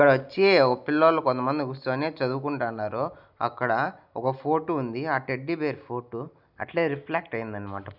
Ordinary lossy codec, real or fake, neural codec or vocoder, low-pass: none; real; none; 5.4 kHz